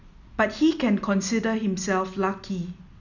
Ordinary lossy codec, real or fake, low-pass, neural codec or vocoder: none; real; 7.2 kHz; none